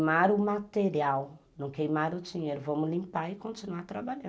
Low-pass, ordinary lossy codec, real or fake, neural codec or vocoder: none; none; real; none